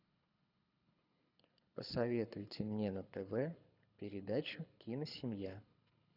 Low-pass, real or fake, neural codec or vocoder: 5.4 kHz; fake; codec, 24 kHz, 6 kbps, HILCodec